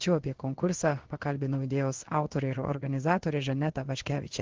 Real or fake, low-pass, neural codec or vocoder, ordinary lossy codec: fake; 7.2 kHz; codec, 16 kHz in and 24 kHz out, 1 kbps, XY-Tokenizer; Opus, 16 kbps